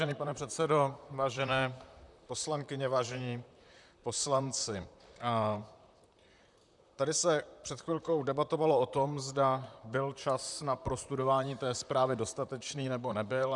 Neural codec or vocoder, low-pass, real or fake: vocoder, 44.1 kHz, 128 mel bands, Pupu-Vocoder; 10.8 kHz; fake